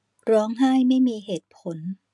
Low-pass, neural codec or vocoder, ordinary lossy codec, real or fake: 10.8 kHz; none; none; real